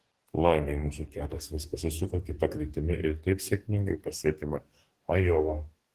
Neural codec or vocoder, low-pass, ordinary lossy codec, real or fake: codec, 44.1 kHz, 2.6 kbps, DAC; 14.4 kHz; Opus, 16 kbps; fake